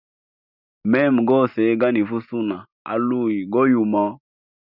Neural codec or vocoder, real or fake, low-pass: none; real; 5.4 kHz